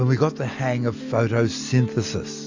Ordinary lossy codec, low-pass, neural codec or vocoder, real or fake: AAC, 48 kbps; 7.2 kHz; none; real